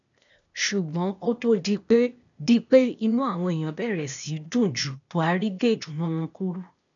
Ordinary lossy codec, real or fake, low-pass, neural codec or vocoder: none; fake; 7.2 kHz; codec, 16 kHz, 0.8 kbps, ZipCodec